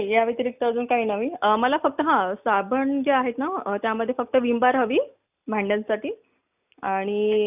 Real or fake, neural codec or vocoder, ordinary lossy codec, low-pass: real; none; none; 3.6 kHz